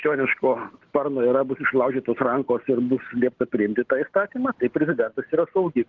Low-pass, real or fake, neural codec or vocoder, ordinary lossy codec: 7.2 kHz; real; none; Opus, 16 kbps